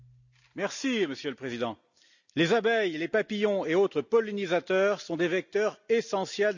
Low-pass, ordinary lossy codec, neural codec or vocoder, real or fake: 7.2 kHz; none; none; real